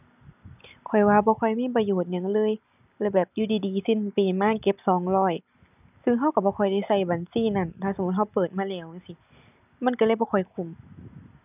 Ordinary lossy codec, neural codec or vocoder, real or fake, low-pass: none; none; real; 3.6 kHz